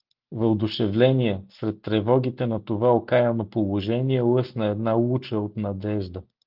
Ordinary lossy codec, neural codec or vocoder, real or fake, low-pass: Opus, 24 kbps; none; real; 5.4 kHz